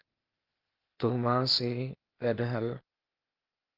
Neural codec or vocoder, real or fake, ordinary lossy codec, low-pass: codec, 16 kHz, 0.8 kbps, ZipCodec; fake; Opus, 24 kbps; 5.4 kHz